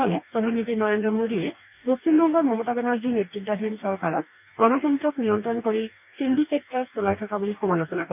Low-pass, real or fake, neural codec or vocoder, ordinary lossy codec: 3.6 kHz; fake; codec, 44.1 kHz, 2.6 kbps, DAC; none